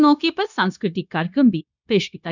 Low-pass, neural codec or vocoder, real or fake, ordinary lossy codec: 7.2 kHz; codec, 16 kHz, 0.9 kbps, LongCat-Audio-Codec; fake; none